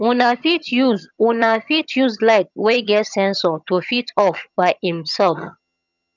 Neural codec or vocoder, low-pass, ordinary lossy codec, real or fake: vocoder, 22.05 kHz, 80 mel bands, HiFi-GAN; 7.2 kHz; none; fake